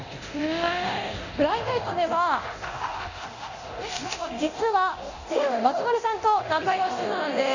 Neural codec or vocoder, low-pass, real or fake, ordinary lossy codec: codec, 24 kHz, 0.9 kbps, DualCodec; 7.2 kHz; fake; none